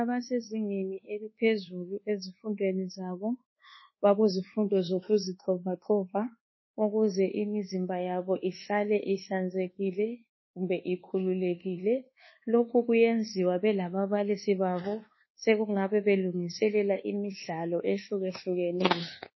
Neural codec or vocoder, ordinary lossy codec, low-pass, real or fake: codec, 24 kHz, 1.2 kbps, DualCodec; MP3, 24 kbps; 7.2 kHz; fake